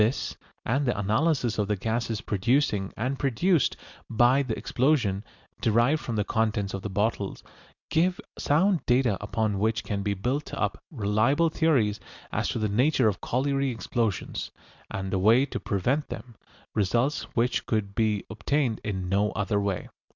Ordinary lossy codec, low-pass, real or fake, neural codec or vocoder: Opus, 64 kbps; 7.2 kHz; real; none